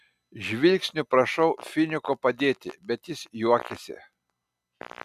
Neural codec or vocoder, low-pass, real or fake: none; 14.4 kHz; real